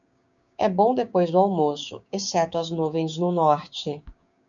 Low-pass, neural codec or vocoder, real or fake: 7.2 kHz; codec, 16 kHz, 6 kbps, DAC; fake